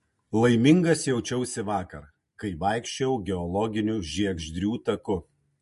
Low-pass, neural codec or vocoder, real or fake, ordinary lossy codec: 14.4 kHz; none; real; MP3, 48 kbps